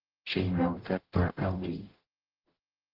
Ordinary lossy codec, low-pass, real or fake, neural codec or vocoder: Opus, 16 kbps; 5.4 kHz; fake; codec, 44.1 kHz, 0.9 kbps, DAC